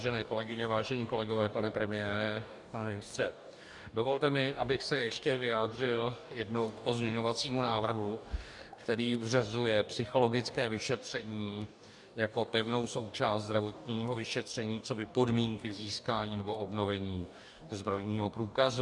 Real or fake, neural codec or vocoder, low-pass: fake; codec, 44.1 kHz, 2.6 kbps, DAC; 10.8 kHz